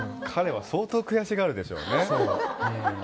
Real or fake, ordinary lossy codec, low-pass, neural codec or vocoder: real; none; none; none